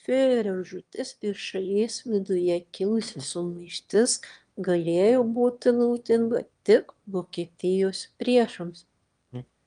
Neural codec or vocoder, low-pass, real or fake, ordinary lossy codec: autoencoder, 22.05 kHz, a latent of 192 numbers a frame, VITS, trained on one speaker; 9.9 kHz; fake; Opus, 32 kbps